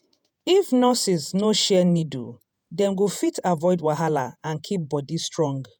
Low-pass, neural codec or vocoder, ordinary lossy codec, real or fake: none; vocoder, 48 kHz, 128 mel bands, Vocos; none; fake